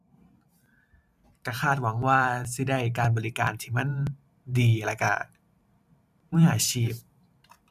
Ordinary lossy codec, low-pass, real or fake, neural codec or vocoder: none; 14.4 kHz; fake; vocoder, 44.1 kHz, 128 mel bands every 512 samples, BigVGAN v2